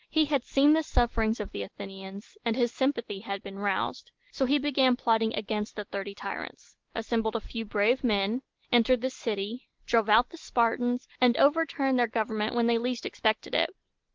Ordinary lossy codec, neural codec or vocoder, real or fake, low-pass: Opus, 16 kbps; none; real; 7.2 kHz